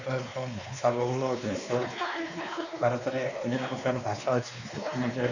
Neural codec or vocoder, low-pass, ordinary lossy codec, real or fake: codec, 16 kHz, 2 kbps, X-Codec, WavLM features, trained on Multilingual LibriSpeech; 7.2 kHz; none; fake